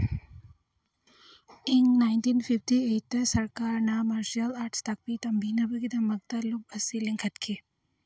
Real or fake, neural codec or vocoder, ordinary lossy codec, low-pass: real; none; none; none